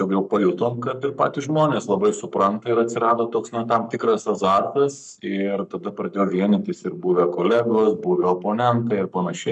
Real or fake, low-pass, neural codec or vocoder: fake; 10.8 kHz; codec, 44.1 kHz, 7.8 kbps, Pupu-Codec